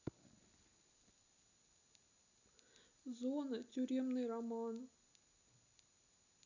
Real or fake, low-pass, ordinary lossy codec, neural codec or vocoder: real; 7.2 kHz; none; none